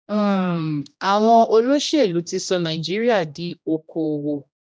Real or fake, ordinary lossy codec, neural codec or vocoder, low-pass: fake; none; codec, 16 kHz, 1 kbps, X-Codec, HuBERT features, trained on general audio; none